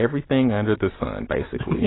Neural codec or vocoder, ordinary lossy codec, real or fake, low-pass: none; AAC, 16 kbps; real; 7.2 kHz